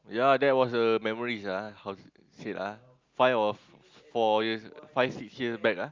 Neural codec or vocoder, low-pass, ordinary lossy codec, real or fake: none; 7.2 kHz; Opus, 24 kbps; real